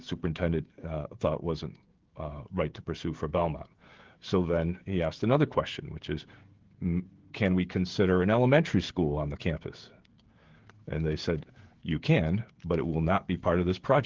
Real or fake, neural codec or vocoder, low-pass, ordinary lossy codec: fake; codec, 16 kHz, 16 kbps, FreqCodec, smaller model; 7.2 kHz; Opus, 16 kbps